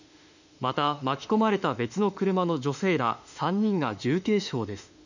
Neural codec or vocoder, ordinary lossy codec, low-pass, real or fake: autoencoder, 48 kHz, 32 numbers a frame, DAC-VAE, trained on Japanese speech; none; 7.2 kHz; fake